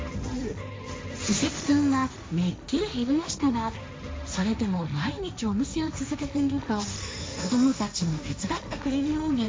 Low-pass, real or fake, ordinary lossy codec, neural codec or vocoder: none; fake; none; codec, 16 kHz, 1.1 kbps, Voila-Tokenizer